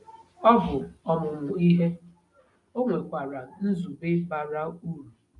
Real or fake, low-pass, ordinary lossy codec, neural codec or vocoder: real; 10.8 kHz; none; none